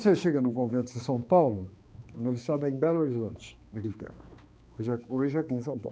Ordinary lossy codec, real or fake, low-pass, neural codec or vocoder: none; fake; none; codec, 16 kHz, 2 kbps, X-Codec, HuBERT features, trained on balanced general audio